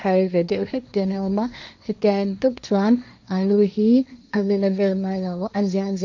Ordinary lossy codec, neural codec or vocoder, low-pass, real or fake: none; codec, 16 kHz, 1.1 kbps, Voila-Tokenizer; 7.2 kHz; fake